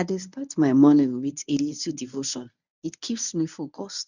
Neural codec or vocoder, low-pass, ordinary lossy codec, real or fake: codec, 24 kHz, 0.9 kbps, WavTokenizer, medium speech release version 1; 7.2 kHz; none; fake